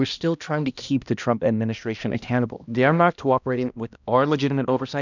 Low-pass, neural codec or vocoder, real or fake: 7.2 kHz; codec, 16 kHz, 1 kbps, X-Codec, HuBERT features, trained on balanced general audio; fake